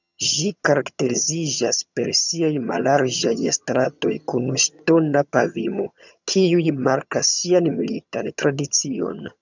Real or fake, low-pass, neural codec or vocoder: fake; 7.2 kHz; vocoder, 22.05 kHz, 80 mel bands, HiFi-GAN